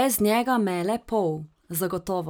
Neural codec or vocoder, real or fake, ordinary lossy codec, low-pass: none; real; none; none